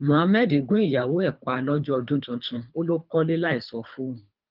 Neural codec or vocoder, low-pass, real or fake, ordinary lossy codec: codec, 24 kHz, 3 kbps, HILCodec; 5.4 kHz; fake; Opus, 24 kbps